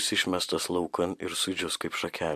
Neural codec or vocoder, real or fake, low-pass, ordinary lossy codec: none; real; 14.4 kHz; MP3, 64 kbps